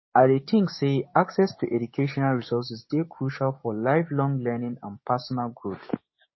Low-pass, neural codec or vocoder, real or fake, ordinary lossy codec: 7.2 kHz; codec, 16 kHz, 6 kbps, DAC; fake; MP3, 24 kbps